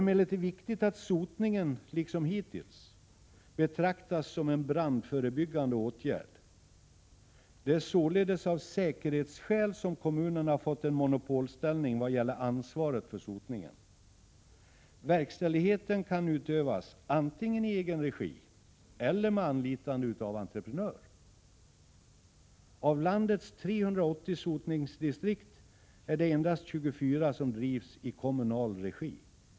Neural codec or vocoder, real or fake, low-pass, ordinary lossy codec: none; real; none; none